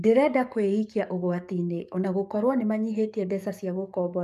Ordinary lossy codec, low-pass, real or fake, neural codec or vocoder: MP3, 96 kbps; 14.4 kHz; fake; codec, 44.1 kHz, 7.8 kbps, DAC